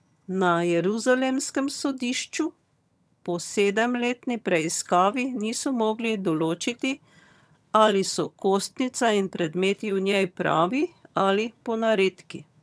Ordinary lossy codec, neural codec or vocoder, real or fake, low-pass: none; vocoder, 22.05 kHz, 80 mel bands, HiFi-GAN; fake; none